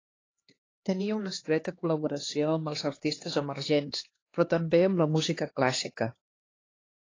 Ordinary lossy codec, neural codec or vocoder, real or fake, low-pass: AAC, 32 kbps; codec, 16 kHz, 2 kbps, X-Codec, HuBERT features, trained on LibriSpeech; fake; 7.2 kHz